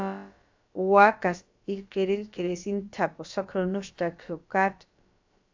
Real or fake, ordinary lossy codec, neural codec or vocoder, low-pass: fake; AAC, 48 kbps; codec, 16 kHz, about 1 kbps, DyCAST, with the encoder's durations; 7.2 kHz